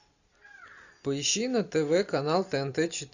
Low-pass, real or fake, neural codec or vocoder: 7.2 kHz; real; none